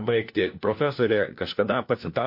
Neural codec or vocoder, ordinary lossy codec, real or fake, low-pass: codec, 16 kHz, 1 kbps, FunCodec, trained on LibriTTS, 50 frames a second; MP3, 32 kbps; fake; 5.4 kHz